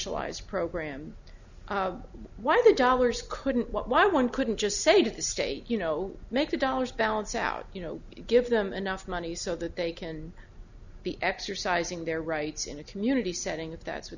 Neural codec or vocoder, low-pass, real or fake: none; 7.2 kHz; real